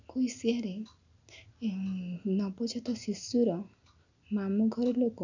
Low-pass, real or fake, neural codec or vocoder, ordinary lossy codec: 7.2 kHz; real; none; none